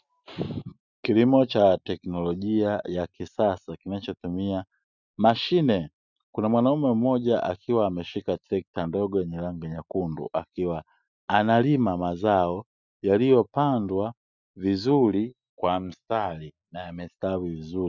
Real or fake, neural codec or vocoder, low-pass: real; none; 7.2 kHz